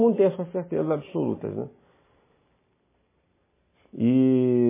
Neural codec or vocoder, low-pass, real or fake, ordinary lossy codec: none; 3.6 kHz; real; MP3, 16 kbps